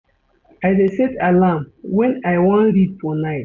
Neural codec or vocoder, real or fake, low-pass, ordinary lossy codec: none; real; 7.2 kHz; none